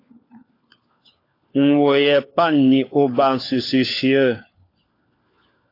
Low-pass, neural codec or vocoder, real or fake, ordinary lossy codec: 5.4 kHz; codec, 16 kHz, 4 kbps, FunCodec, trained on LibriTTS, 50 frames a second; fake; AAC, 32 kbps